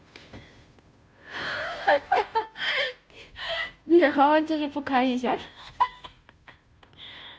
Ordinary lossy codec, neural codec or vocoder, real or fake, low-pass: none; codec, 16 kHz, 0.5 kbps, FunCodec, trained on Chinese and English, 25 frames a second; fake; none